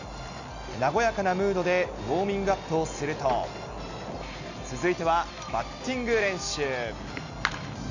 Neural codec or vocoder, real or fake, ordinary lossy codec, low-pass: none; real; none; 7.2 kHz